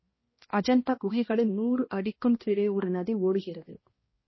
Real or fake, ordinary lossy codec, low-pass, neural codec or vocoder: fake; MP3, 24 kbps; 7.2 kHz; codec, 16 kHz, 1 kbps, X-Codec, HuBERT features, trained on balanced general audio